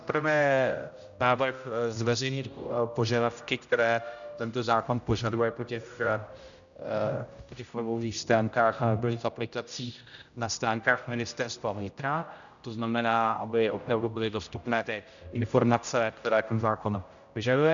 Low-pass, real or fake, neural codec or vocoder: 7.2 kHz; fake; codec, 16 kHz, 0.5 kbps, X-Codec, HuBERT features, trained on general audio